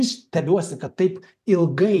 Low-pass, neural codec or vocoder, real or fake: 14.4 kHz; vocoder, 44.1 kHz, 128 mel bands every 256 samples, BigVGAN v2; fake